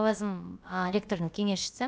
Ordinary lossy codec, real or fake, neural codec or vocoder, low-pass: none; fake; codec, 16 kHz, about 1 kbps, DyCAST, with the encoder's durations; none